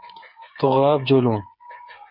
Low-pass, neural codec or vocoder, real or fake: 5.4 kHz; codec, 16 kHz, 8 kbps, FreqCodec, smaller model; fake